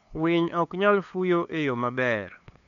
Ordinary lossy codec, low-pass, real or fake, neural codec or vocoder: none; 7.2 kHz; fake; codec, 16 kHz, 2 kbps, FunCodec, trained on LibriTTS, 25 frames a second